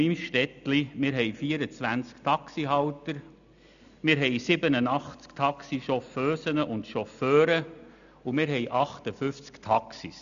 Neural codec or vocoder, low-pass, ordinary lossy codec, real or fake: none; 7.2 kHz; none; real